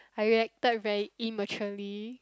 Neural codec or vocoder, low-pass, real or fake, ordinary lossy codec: none; none; real; none